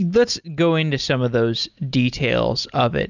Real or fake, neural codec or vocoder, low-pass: real; none; 7.2 kHz